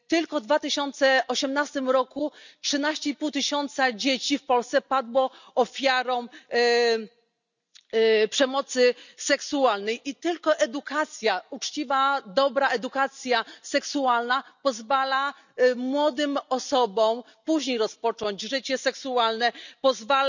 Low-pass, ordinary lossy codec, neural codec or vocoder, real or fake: 7.2 kHz; none; none; real